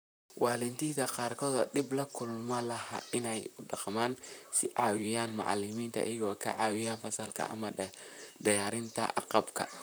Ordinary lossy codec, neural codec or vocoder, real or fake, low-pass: none; vocoder, 44.1 kHz, 128 mel bands, Pupu-Vocoder; fake; none